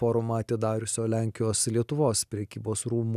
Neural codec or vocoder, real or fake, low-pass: none; real; 14.4 kHz